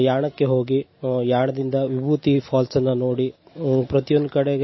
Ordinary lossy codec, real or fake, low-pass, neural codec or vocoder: MP3, 24 kbps; real; 7.2 kHz; none